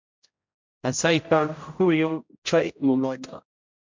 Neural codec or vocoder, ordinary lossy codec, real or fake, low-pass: codec, 16 kHz, 0.5 kbps, X-Codec, HuBERT features, trained on general audio; MP3, 64 kbps; fake; 7.2 kHz